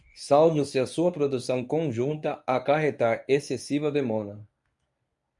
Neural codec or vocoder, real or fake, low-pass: codec, 24 kHz, 0.9 kbps, WavTokenizer, medium speech release version 1; fake; 10.8 kHz